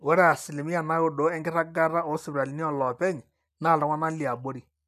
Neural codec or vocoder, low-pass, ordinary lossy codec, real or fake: none; 14.4 kHz; none; real